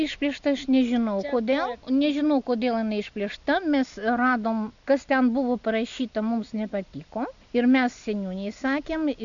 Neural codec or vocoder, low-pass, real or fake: none; 7.2 kHz; real